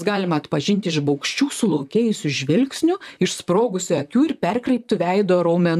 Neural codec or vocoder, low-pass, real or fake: vocoder, 44.1 kHz, 128 mel bands, Pupu-Vocoder; 14.4 kHz; fake